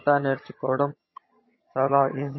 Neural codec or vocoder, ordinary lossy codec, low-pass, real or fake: vocoder, 22.05 kHz, 80 mel bands, HiFi-GAN; MP3, 24 kbps; 7.2 kHz; fake